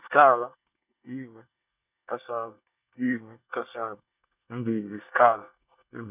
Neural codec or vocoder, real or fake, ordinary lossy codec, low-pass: codec, 24 kHz, 1 kbps, SNAC; fake; none; 3.6 kHz